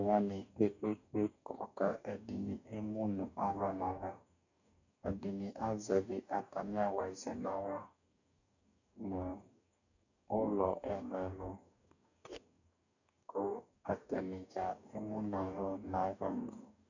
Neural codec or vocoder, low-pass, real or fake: codec, 44.1 kHz, 2.6 kbps, DAC; 7.2 kHz; fake